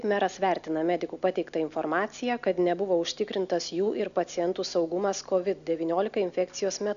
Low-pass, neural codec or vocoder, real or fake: 7.2 kHz; none; real